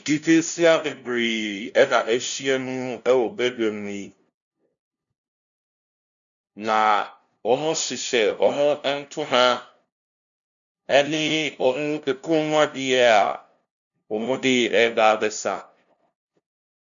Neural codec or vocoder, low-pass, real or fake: codec, 16 kHz, 0.5 kbps, FunCodec, trained on LibriTTS, 25 frames a second; 7.2 kHz; fake